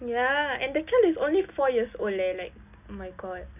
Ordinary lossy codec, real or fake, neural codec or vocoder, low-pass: none; real; none; 3.6 kHz